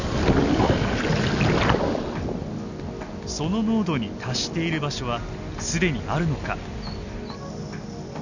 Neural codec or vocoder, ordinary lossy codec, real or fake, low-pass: none; none; real; 7.2 kHz